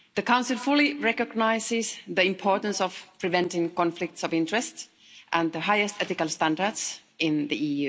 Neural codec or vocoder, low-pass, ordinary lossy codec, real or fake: none; none; none; real